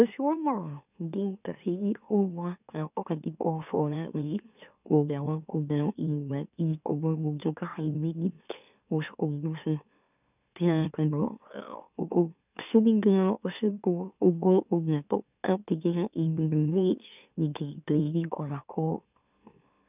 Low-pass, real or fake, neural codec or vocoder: 3.6 kHz; fake; autoencoder, 44.1 kHz, a latent of 192 numbers a frame, MeloTTS